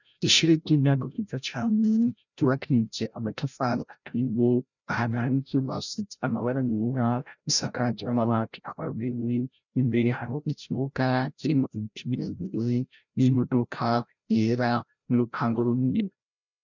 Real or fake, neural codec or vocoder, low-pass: fake; codec, 16 kHz, 0.5 kbps, FreqCodec, larger model; 7.2 kHz